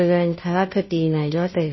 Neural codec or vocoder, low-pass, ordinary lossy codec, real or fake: codec, 16 kHz, 0.5 kbps, FunCodec, trained on Chinese and English, 25 frames a second; 7.2 kHz; MP3, 24 kbps; fake